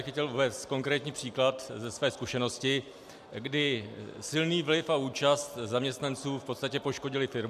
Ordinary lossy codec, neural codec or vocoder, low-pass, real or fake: MP3, 96 kbps; none; 14.4 kHz; real